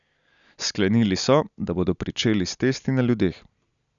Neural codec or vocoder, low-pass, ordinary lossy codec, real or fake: none; 7.2 kHz; none; real